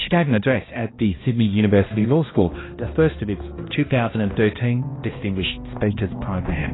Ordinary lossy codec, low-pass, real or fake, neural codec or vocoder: AAC, 16 kbps; 7.2 kHz; fake; codec, 16 kHz, 1 kbps, X-Codec, HuBERT features, trained on balanced general audio